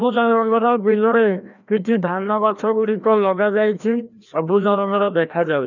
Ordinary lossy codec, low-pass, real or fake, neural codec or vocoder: none; 7.2 kHz; fake; codec, 16 kHz, 1 kbps, FreqCodec, larger model